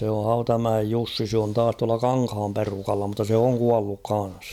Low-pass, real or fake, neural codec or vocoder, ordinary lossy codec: 19.8 kHz; real; none; none